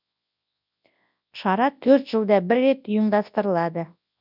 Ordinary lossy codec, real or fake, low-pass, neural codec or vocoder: none; fake; 5.4 kHz; codec, 24 kHz, 0.9 kbps, WavTokenizer, large speech release